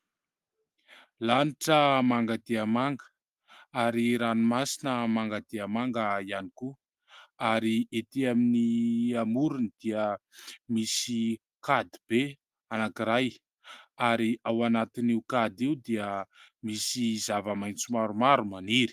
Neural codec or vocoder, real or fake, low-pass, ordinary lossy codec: none; real; 14.4 kHz; Opus, 32 kbps